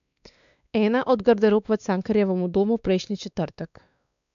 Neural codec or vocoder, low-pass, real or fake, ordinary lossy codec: codec, 16 kHz, 2 kbps, X-Codec, WavLM features, trained on Multilingual LibriSpeech; 7.2 kHz; fake; none